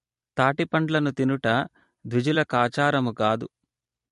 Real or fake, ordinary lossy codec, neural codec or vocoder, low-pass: fake; MP3, 48 kbps; vocoder, 44.1 kHz, 128 mel bands every 256 samples, BigVGAN v2; 14.4 kHz